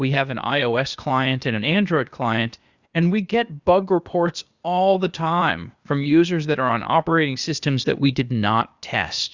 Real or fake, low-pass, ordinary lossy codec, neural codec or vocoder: fake; 7.2 kHz; Opus, 64 kbps; codec, 16 kHz, 0.8 kbps, ZipCodec